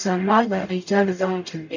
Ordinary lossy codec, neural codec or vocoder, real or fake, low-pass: none; codec, 44.1 kHz, 0.9 kbps, DAC; fake; 7.2 kHz